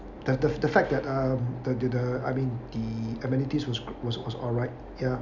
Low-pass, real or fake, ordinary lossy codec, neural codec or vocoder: 7.2 kHz; real; none; none